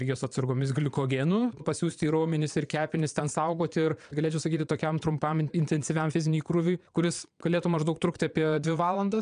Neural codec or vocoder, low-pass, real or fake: vocoder, 22.05 kHz, 80 mel bands, Vocos; 9.9 kHz; fake